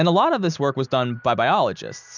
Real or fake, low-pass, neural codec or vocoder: real; 7.2 kHz; none